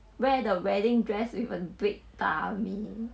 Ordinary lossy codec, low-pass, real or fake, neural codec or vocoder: none; none; real; none